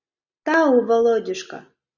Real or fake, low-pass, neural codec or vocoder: real; 7.2 kHz; none